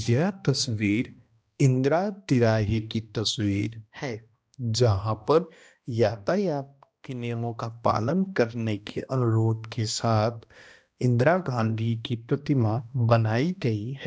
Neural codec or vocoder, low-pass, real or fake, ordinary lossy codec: codec, 16 kHz, 1 kbps, X-Codec, HuBERT features, trained on balanced general audio; none; fake; none